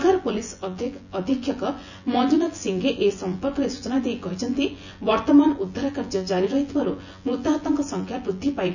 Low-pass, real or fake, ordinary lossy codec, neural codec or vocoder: 7.2 kHz; fake; none; vocoder, 24 kHz, 100 mel bands, Vocos